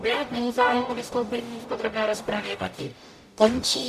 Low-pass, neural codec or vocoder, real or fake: 14.4 kHz; codec, 44.1 kHz, 0.9 kbps, DAC; fake